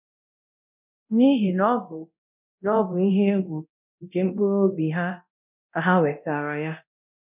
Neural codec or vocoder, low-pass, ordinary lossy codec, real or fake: codec, 24 kHz, 0.9 kbps, DualCodec; 3.6 kHz; none; fake